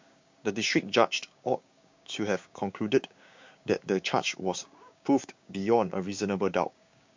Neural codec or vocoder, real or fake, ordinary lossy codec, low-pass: codec, 16 kHz, 6 kbps, DAC; fake; MP3, 48 kbps; 7.2 kHz